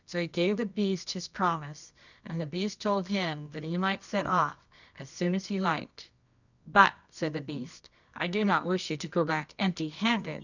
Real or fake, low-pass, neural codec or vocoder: fake; 7.2 kHz; codec, 24 kHz, 0.9 kbps, WavTokenizer, medium music audio release